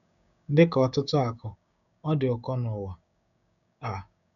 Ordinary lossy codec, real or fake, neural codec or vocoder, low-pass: none; fake; codec, 16 kHz, 6 kbps, DAC; 7.2 kHz